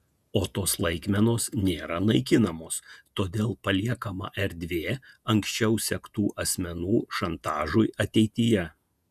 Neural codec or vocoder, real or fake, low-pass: vocoder, 48 kHz, 128 mel bands, Vocos; fake; 14.4 kHz